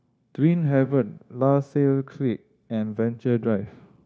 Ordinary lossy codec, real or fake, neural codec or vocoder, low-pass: none; fake; codec, 16 kHz, 0.9 kbps, LongCat-Audio-Codec; none